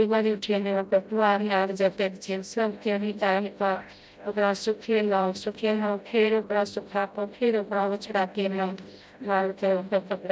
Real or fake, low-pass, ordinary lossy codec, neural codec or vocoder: fake; none; none; codec, 16 kHz, 0.5 kbps, FreqCodec, smaller model